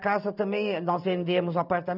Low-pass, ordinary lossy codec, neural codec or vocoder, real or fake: 5.4 kHz; none; none; real